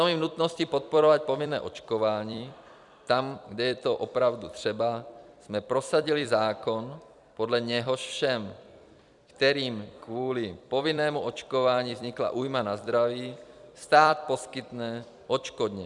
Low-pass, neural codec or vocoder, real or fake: 10.8 kHz; vocoder, 44.1 kHz, 128 mel bands every 256 samples, BigVGAN v2; fake